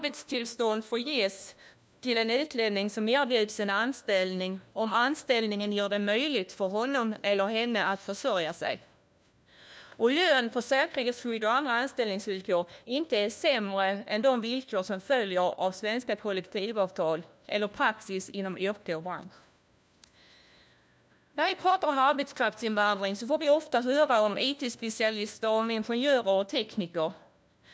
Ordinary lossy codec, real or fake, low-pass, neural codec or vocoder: none; fake; none; codec, 16 kHz, 1 kbps, FunCodec, trained on LibriTTS, 50 frames a second